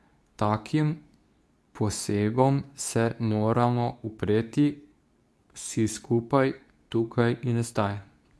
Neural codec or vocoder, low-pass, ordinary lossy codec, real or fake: codec, 24 kHz, 0.9 kbps, WavTokenizer, medium speech release version 2; none; none; fake